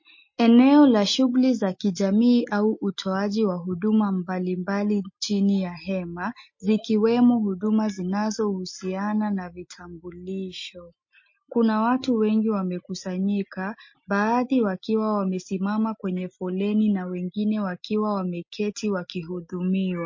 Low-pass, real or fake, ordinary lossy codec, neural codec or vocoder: 7.2 kHz; real; MP3, 32 kbps; none